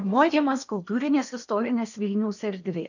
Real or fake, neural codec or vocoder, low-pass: fake; codec, 16 kHz in and 24 kHz out, 0.8 kbps, FocalCodec, streaming, 65536 codes; 7.2 kHz